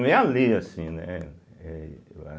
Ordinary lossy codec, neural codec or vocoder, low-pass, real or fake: none; none; none; real